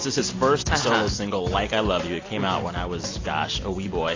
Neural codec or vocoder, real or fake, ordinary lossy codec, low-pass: none; real; AAC, 32 kbps; 7.2 kHz